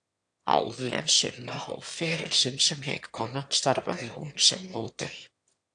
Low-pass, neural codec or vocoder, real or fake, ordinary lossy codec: 9.9 kHz; autoencoder, 22.05 kHz, a latent of 192 numbers a frame, VITS, trained on one speaker; fake; AAC, 64 kbps